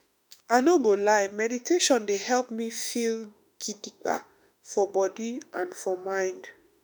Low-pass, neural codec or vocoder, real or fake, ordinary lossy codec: none; autoencoder, 48 kHz, 32 numbers a frame, DAC-VAE, trained on Japanese speech; fake; none